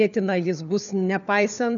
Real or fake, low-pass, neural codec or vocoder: fake; 7.2 kHz; codec, 16 kHz, 4 kbps, FunCodec, trained on LibriTTS, 50 frames a second